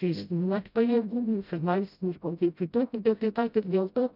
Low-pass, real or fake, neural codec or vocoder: 5.4 kHz; fake; codec, 16 kHz, 0.5 kbps, FreqCodec, smaller model